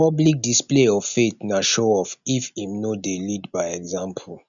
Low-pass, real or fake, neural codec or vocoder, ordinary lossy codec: 7.2 kHz; real; none; none